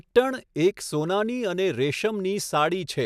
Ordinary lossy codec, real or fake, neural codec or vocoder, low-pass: none; real; none; 14.4 kHz